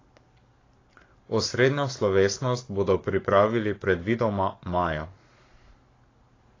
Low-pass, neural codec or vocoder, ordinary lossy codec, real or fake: 7.2 kHz; codec, 44.1 kHz, 7.8 kbps, Pupu-Codec; AAC, 32 kbps; fake